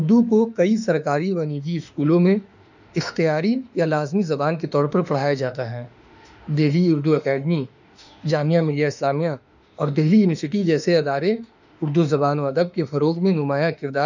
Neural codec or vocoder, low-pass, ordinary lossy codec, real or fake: autoencoder, 48 kHz, 32 numbers a frame, DAC-VAE, trained on Japanese speech; 7.2 kHz; none; fake